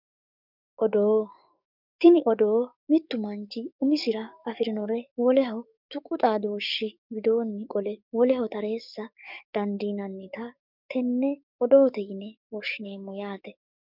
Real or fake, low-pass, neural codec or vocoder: fake; 5.4 kHz; codec, 44.1 kHz, 7.8 kbps, DAC